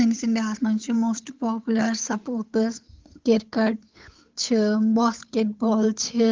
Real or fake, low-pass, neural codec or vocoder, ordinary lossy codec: fake; 7.2 kHz; codec, 16 kHz, 8 kbps, FunCodec, trained on Chinese and English, 25 frames a second; Opus, 32 kbps